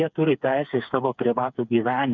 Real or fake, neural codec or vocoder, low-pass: fake; codec, 16 kHz, 4 kbps, FreqCodec, smaller model; 7.2 kHz